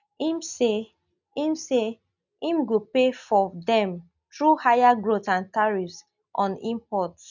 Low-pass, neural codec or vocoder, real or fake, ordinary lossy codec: 7.2 kHz; none; real; none